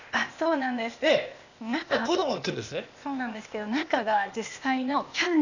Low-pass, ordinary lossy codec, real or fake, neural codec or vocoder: 7.2 kHz; none; fake; codec, 16 kHz, 0.8 kbps, ZipCodec